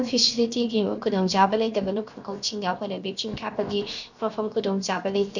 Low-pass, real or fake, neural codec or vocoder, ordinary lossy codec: 7.2 kHz; fake; codec, 16 kHz, 0.7 kbps, FocalCodec; none